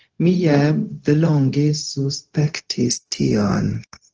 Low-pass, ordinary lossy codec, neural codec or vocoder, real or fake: 7.2 kHz; Opus, 16 kbps; codec, 16 kHz, 0.4 kbps, LongCat-Audio-Codec; fake